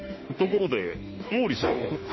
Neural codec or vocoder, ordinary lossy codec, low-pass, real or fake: autoencoder, 48 kHz, 32 numbers a frame, DAC-VAE, trained on Japanese speech; MP3, 24 kbps; 7.2 kHz; fake